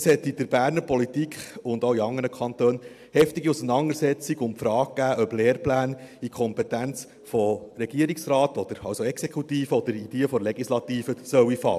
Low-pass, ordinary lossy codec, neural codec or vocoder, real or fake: 14.4 kHz; AAC, 96 kbps; none; real